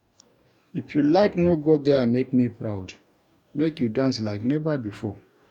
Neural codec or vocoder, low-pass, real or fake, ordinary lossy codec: codec, 44.1 kHz, 2.6 kbps, DAC; 19.8 kHz; fake; none